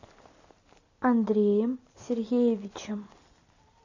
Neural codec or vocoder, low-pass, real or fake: none; 7.2 kHz; real